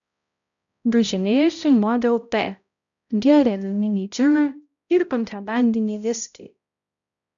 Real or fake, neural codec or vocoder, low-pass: fake; codec, 16 kHz, 0.5 kbps, X-Codec, HuBERT features, trained on balanced general audio; 7.2 kHz